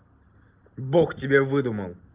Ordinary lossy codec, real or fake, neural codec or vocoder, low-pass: Opus, 32 kbps; real; none; 3.6 kHz